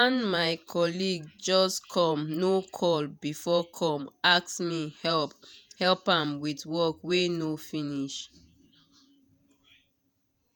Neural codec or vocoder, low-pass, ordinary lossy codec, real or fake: vocoder, 48 kHz, 128 mel bands, Vocos; none; none; fake